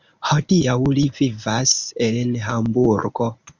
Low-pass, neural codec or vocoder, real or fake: 7.2 kHz; codec, 24 kHz, 0.9 kbps, WavTokenizer, medium speech release version 1; fake